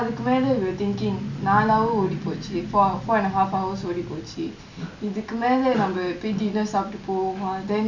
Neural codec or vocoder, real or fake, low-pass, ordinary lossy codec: none; real; 7.2 kHz; none